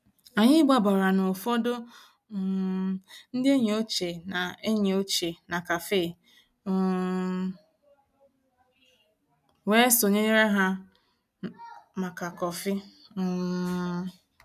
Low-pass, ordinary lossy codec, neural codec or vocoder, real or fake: 14.4 kHz; none; none; real